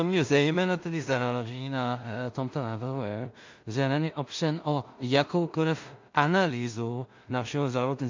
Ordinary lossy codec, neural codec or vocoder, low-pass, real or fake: MP3, 48 kbps; codec, 16 kHz in and 24 kHz out, 0.4 kbps, LongCat-Audio-Codec, two codebook decoder; 7.2 kHz; fake